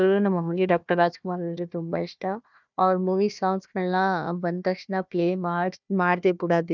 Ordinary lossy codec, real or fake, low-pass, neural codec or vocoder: none; fake; 7.2 kHz; codec, 16 kHz, 1 kbps, FunCodec, trained on Chinese and English, 50 frames a second